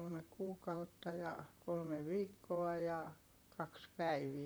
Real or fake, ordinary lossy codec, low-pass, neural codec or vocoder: fake; none; none; vocoder, 44.1 kHz, 128 mel bands, Pupu-Vocoder